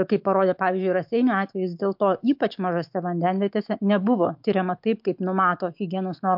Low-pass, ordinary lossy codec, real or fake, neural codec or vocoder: 5.4 kHz; MP3, 48 kbps; fake; autoencoder, 48 kHz, 128 numbers a frame, DAC-VAE, trained on Japanese speech